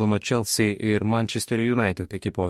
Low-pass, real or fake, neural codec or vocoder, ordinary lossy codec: 19.8 kHz; fake; codec, 44.1 kHz, 2.6 kbps, DAC; MP3, 64 kbps